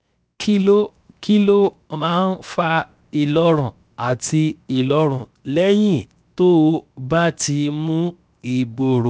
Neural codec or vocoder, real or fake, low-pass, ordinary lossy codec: codec, 16 kHz, 0.7 kbps, FocalCodec; fake; none; none